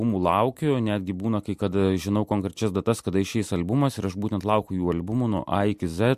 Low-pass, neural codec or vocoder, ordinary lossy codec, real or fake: 14.4 kHz; none; MP3, 64 kbps; real